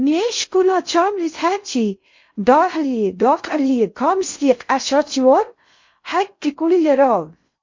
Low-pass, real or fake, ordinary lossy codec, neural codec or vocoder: 7.2 kHz; fake; MP3, 48 kbps; codec, 16 kHz in and 24 kHz out, 0.6 kbps, FocalCodec, streaming, 2048 codes